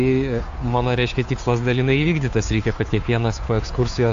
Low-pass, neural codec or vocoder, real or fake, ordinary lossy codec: 7.2 kHz; codec, 16 kHz, 2 kbps, FunCodec, trained on Chinese and English, 25 frames a second; fake; AAC, 64 kbps